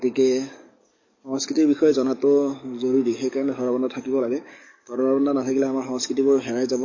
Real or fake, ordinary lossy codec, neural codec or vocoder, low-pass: fake; MP3, 32 kbps; codec, 44.1 kHz, 7.8 kbps, DAC; 7.2 kHz